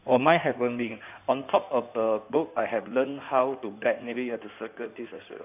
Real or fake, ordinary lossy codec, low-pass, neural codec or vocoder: fake; none; 3.6 kHz; codec, 16 kHz in and 24 kHz out, 2.2 kbps, FireRedTTS-2 codec